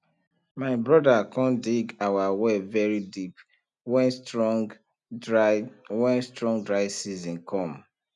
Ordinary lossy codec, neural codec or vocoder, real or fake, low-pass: none; none; real; 10.8 kHz